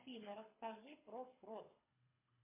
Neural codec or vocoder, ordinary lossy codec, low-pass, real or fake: codec, 24 kHz, 6 kbps, HILCodec; MP3, 24 kbps; 3.6 kHz; fake